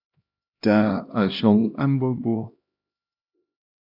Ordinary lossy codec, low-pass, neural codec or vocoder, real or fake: AAC, 48 kbps; 5.4 kHz; codec, 16 kHz, 1 kbps, X-Codec, HuBERT features, trained on LibriSpeech; fake